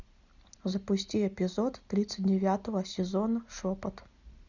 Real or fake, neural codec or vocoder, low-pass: real; none; 7.2 kHz